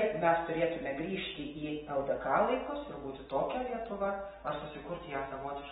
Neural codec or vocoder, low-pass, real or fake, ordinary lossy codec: none; 7.2 kHz; real; AAC, 16 kbps